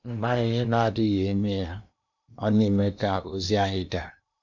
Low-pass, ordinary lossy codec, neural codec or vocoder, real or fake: 7.2 kHz; none; codec, 16 kHz in and 24 kHz out, 0.8 kbps, FocalCodec, streaming, 65536 codes; fake